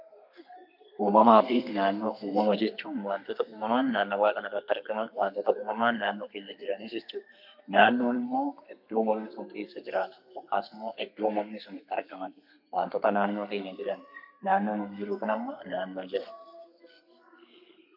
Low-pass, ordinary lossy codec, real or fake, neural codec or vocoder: 5.4 kHz; MP3, 48 kbps; fake; codec, 32 kHz, 1.9 kbps, SNAC